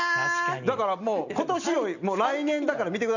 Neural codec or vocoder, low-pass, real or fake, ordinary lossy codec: none; 7.2 kHz; real; none